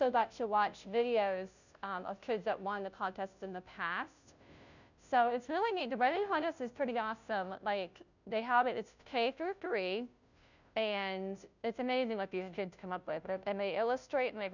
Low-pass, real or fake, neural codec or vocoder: 7.2 kHz; fake; codec, 16 kHz, 0.5 kbps, FunCodec, trained on Chinese and English, 25 frames a second